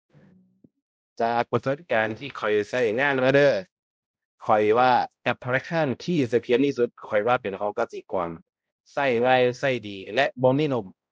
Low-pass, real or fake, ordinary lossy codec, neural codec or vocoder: none; fake; none; codec, 16 kHz, 0.5 kbps, X-Codec, HuBERT features, trained on balanced general audio